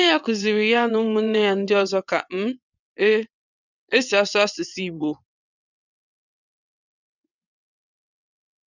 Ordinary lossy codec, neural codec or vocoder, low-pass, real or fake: none; vocoder, 22.05 kHz, 80 mel bands, WaveNeXt; 7.2 kHz; fake